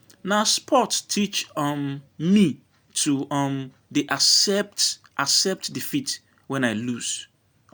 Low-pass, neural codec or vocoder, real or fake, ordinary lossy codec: none; none; real; none